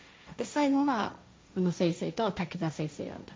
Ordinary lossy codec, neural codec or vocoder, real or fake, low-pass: none; codec, 16 kHz, 1.1 kbps, Voila-Tokenizer; fake; none